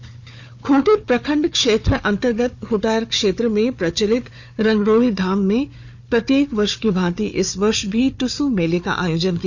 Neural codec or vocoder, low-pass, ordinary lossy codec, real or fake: codec, 16 kHz, 4 kbps, FunCodec, trained on Chinese and English, 50 frames a second; 7.2 kHz; none; fake